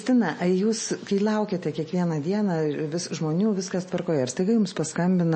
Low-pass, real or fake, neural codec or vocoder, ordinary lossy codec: 10.8 kHz; real; none; MP3, 32 kbps